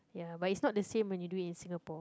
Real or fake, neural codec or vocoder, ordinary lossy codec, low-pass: real; none; none; none